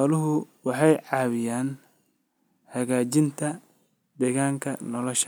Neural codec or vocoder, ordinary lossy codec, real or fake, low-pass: none; none; real; none